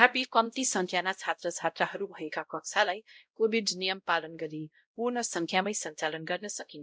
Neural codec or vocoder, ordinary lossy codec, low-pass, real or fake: codec, 16 kHz, 0.5 kbps, X-Codec, WavLM features, trained on Multilingual LibriSpeech; none; none; fake